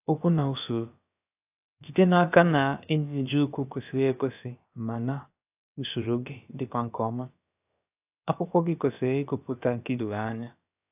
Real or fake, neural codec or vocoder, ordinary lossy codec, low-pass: fake; codec, 16 kHz, about 1 kbps, DyCAST, with the encoder's durations; AAC, 32 kbps; 3.6 kHz